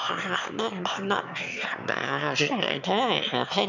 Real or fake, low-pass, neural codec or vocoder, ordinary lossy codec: fake; 7.2 kHz; autoencoder, 22.05 kHz, a latent of 192 numbers a frame, VITS, trained on one speaker; none